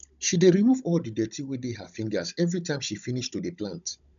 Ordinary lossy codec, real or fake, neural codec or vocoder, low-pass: none; fake; codec, 16 kHz, 16 kbps, FunCodec, trained on Chinese and English, 50 frames a second; 7.2 kHz